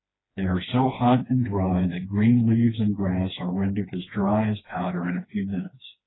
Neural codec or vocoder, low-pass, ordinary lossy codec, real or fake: codec, 16 kHz, 2 kbps, FreqCodec, smaller model; 7.2 kHz; AAC, 16 kbps; fake